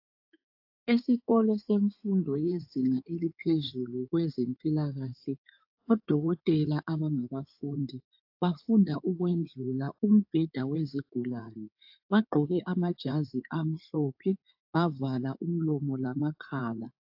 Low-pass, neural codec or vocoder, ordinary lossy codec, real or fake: 5.4 kHz; codec, 16 kHz in and 24 kHz out, 2.2 kbps, FireRedTTS-2 codec; AAC, 48 kbps; fake